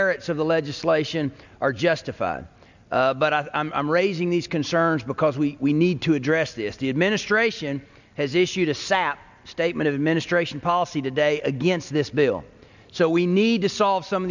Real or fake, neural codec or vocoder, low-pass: real; none; 7.2 kHz